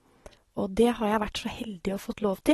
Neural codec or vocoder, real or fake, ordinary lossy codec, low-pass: none; real; AAC, 32 kbps; 14.4 kHz